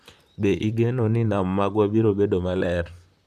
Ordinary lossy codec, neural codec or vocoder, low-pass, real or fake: none; vocoder, 44.1 kHz, 128 mel bands, Pupu-Vocoder; 14.4 kHz; fake